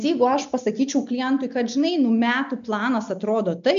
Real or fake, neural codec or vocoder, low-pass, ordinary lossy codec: real; none; 7.2 kHz; MP3, 64 kbps